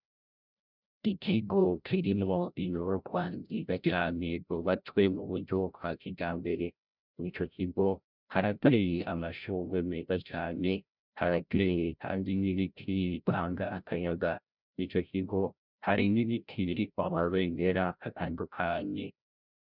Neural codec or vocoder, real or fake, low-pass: codec, 16 kHz, 0.5 kbps, FreqCodec, larger model; fake; 5.4 kHz